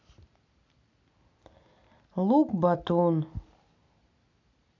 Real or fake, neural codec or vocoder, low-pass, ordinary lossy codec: real; none; 7.2 kHz; AAC, 48 kbps